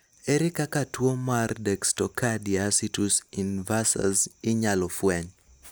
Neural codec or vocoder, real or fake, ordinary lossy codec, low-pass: none; real; none; none